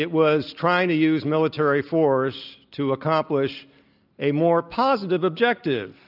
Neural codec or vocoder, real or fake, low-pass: none; real; 5.4 kHz